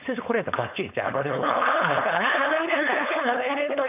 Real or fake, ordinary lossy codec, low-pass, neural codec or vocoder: fake; none; 3.6 kHz; codec, 16 kHz, 4.8 kbps, FACodec